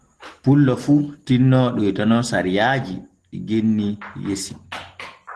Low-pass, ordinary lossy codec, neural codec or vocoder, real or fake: 10.8 kHz; Opus, 16 kbps; none; real